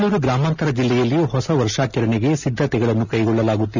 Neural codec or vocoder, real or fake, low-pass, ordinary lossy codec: none; real; 7.2 kHz; none